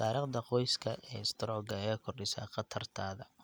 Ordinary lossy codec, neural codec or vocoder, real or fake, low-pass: none; none; real; none